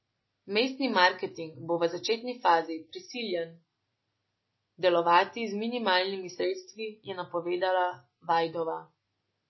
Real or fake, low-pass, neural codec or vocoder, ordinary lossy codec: real; 7.2 kHz; none; MP3, 24 kbps